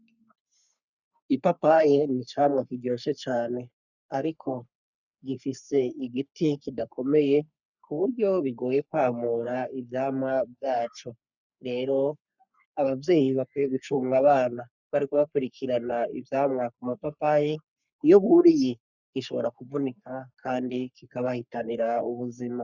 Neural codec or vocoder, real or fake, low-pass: codec, 44.1 kHz, 3.4 kbps, Pupu-Codec; fake; 7.2 kHz